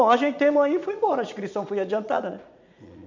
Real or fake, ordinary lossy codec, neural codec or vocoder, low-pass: fake; MP3, 64 kbps; vocoder, 44.1 kHz, 80 mel bands, Vocos; 7.2 kHz